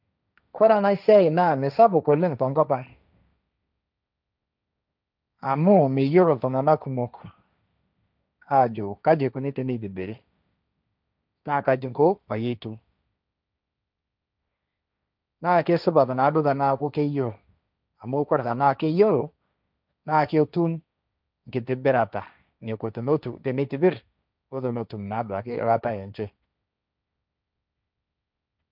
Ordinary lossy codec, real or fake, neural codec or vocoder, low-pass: none; fake; codec, 16 kHz, 1.1 kbps, Voila-Tokenizer; 5.4 kHz